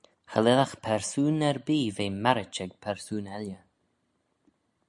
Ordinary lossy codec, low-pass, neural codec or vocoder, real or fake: MP3, 96 kbps; 10.8 kHz; none; real